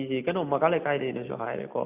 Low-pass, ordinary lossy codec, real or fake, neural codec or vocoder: 3.6 kHz; AAC, 32 kbps; real; none